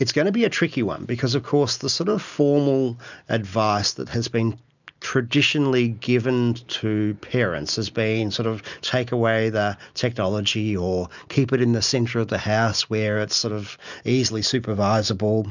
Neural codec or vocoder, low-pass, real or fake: none; 7.2 kHz; real